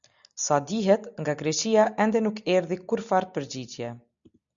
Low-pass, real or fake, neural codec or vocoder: 7.2 kHz; real; none